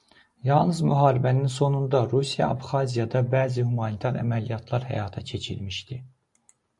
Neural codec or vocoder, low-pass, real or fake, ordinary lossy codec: none; 10.8 kHz; real; MP3, 64 kbps